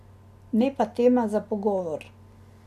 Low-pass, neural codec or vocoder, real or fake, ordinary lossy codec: 14.4 kHz; none; real; none